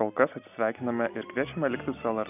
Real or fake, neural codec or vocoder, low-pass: real; none; 3.6 kHz